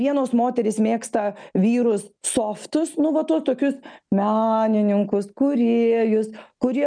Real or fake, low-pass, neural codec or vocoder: real; 9.9 kHz; none